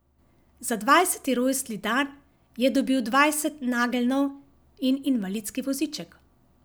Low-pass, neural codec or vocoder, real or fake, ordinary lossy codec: none; none; real; none